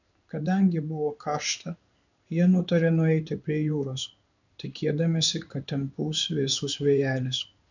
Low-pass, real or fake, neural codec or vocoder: 7.2 kHz; fake; codec, 16 kHz in and 24 kHz out, 1 kbps, XY-Tokenizer